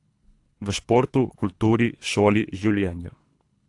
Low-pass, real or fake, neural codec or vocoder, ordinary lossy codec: 10.8 kHz; fake; codec, 24 kHz, 3 kbps, HILCodec; AAC, 48 kbps